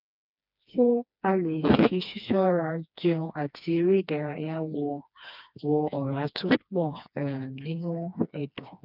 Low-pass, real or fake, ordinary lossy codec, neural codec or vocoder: 5.4 kHz; fake; none; codec, 16 kHz, 2 kbps, FreqCodec, smaller model